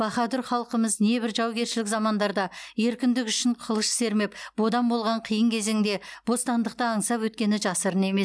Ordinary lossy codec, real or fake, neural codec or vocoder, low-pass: none; real; none; none